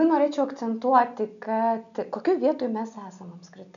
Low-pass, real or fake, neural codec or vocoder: 7.2 kHz; real; none